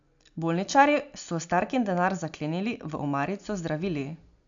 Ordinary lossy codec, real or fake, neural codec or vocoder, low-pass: AAC, 64 kbps; real; none; 7.2 kHz